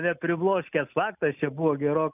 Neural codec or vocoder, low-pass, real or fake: none; 3.6 kHz; real